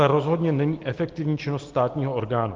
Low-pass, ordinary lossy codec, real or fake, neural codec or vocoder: 7.2 kHz; Opus, 16 kbps; real; none